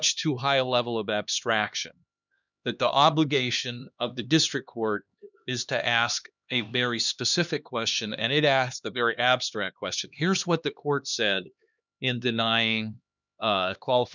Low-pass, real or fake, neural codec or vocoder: 7.2 kHz; fake; codec, 16 kHz, 2 kbps, X-Codec, HuBERT features, trained on LibriSpeech